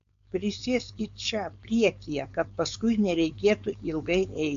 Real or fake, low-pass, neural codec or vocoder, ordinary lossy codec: fake; 7.2 kHz; codec, 16 kHz, 4.8 kbps, FACodec; AAC, 64 kbps